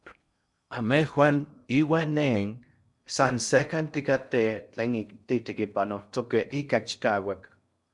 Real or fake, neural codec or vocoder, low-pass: fake; codec, 16 kHz in and 24 kHz out, 0.6 kbps, FocalCodec, streaming, 2048 codes; 10.8 kHz